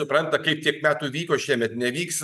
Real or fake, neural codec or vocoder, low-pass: real; none; 14.4 kHz